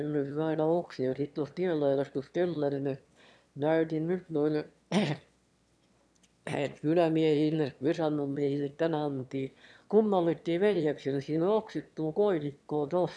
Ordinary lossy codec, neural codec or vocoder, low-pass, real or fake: none; autoencoder, 22.05 kHz, a latent of 192 numbers a frame, VITS, trained on one speaker; none; fake